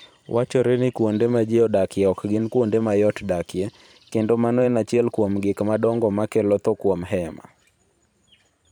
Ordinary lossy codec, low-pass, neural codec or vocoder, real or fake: none; 19.8 kHz; vocoder, 44.1 kHz, 128 mel bands every 512 samples, BigVGAN v2; fake